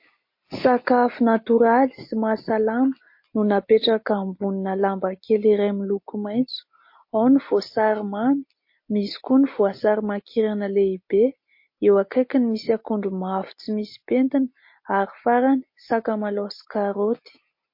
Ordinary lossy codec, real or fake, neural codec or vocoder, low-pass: MP3, 32 kbps; real; none; 5.4 kHz